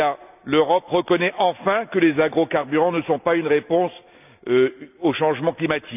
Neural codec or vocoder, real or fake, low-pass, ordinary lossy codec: none; real; 3.6 kHz; none